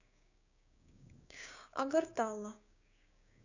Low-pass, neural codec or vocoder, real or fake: 7.2 kHz; codec, 16 kHz, 6 kbps, DAC; fake